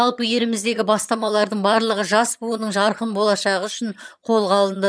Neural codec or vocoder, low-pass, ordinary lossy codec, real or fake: vocoder, 22.05 kHz, 80 mel bands, HiFi-GAN; none; none; fake